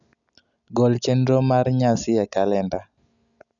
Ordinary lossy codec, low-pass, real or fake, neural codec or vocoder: none; 7.2 kHz; real; none